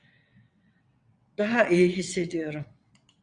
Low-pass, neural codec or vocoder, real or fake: 9.9 kHz; vocoder, 22.05 kHz, 80 mel bands, WaveNeXt; fake